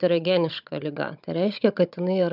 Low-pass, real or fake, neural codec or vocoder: 5.4 kHz; fake; codec, 16 kHz, 16 kbps, FunCodec, trained on Chinese and English, 50 frames a second